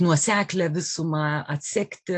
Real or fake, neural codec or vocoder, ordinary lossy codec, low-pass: real; none; AAC, 64 kbps; 9.9 kHz